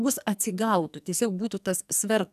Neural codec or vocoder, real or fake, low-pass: codec, 44.1 kHz, 2.6 kbps, SNAC; fake; 14.4 kHz